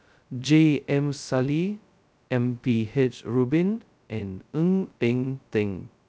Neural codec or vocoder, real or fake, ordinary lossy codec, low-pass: codec, 16 kHz, 0.2 kbps, FocalCodec; fake; none; none